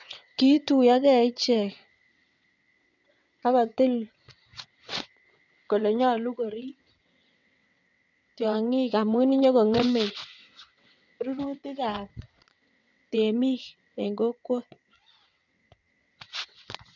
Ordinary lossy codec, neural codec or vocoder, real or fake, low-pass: none; vocoder, 22.05 kHz, 80 mel bands, WaveNeXt; fake; 7.2 kHz